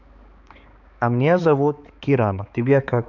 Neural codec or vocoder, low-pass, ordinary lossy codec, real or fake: codec, 16 kHz, 4 kbps, X-Codec, HuBERT features, trained on balanced general audio; 7.2 kHz; none; fake